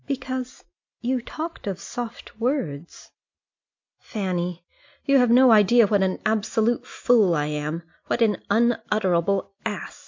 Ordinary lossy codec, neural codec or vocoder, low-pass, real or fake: MP3, 64 kbps; none; 7.2 kHz; real